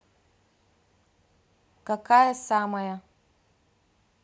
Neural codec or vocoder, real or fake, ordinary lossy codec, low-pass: none; real; none; none